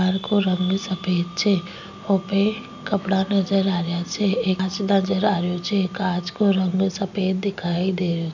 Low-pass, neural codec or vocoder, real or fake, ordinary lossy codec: 7.2 kHz; none; real; none